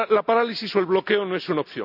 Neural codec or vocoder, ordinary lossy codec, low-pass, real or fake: none; none; 5.4 kHz; real